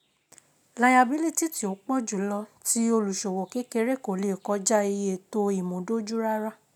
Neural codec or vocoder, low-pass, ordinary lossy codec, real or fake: none; 19.8 kHz; none; real